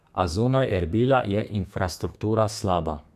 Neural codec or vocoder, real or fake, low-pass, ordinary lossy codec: codec, 32 kHz, 1.9 kbps, SNAC; fake; 14.4 kHz; none